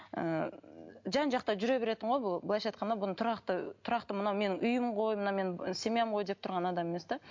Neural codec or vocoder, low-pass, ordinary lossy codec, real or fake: none; 7.2 kHz; MP3, 48 kbps; real